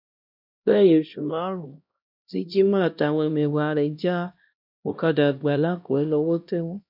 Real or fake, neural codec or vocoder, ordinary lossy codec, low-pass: fake; codec, 16 kHz, 1 kbps, X-Codec, HuBERT features, trained on LibriSpeech; none; 5.4 kHz